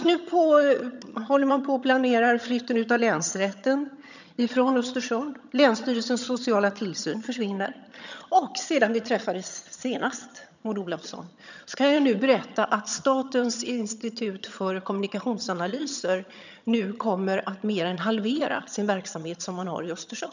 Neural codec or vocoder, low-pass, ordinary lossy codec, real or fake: vocoder, 22.05 kHz, 80 mel bands, HiFi-GAN; 7.2 kHz; none; fake